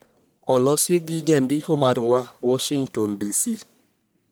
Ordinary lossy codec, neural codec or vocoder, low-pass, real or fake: none; codec, 44.1 kHz, 1.7 kbps, Pupu-Codec; none; fake